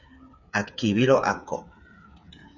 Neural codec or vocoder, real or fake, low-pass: codec, 16 kHz, 8 kbps, FreqCodec, smaller model; fake; 7.2 kHz